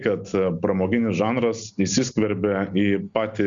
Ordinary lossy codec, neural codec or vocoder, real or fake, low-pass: Opus, 64 kbps; none; real; 7.2 kHz